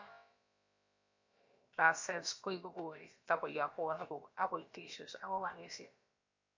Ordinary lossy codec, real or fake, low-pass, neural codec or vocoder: MP3, 48 kbps; fake; 7.2 kHz; codec, 16 kHz, about 1 kbps, DyCAST, with the encoder's durations